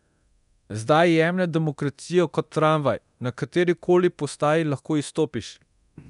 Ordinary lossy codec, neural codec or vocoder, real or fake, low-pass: none; codec, 24 kHz, 0.9 kbps, DualCodec; fake; 10.8 kHz